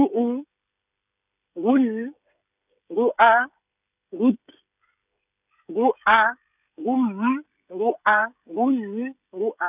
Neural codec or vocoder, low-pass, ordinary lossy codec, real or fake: codec, 16 kHz, 16 kbps, FreqCodec, smaller model; 3.6 kHz; none; fake